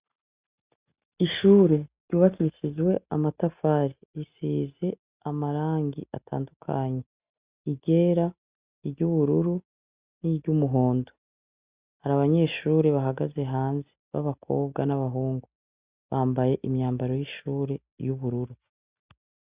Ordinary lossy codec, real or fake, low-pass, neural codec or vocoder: Opus, 64 kbps; real; 3.6 kHz; none